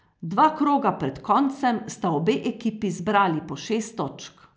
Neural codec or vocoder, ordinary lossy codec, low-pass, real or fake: none; none; none; real